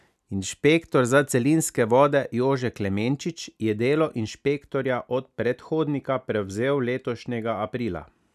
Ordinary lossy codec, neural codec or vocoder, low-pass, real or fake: none; none; 14.4 kHz; real